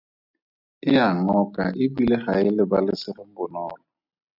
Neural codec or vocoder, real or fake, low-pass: none; real; 5.4 kHz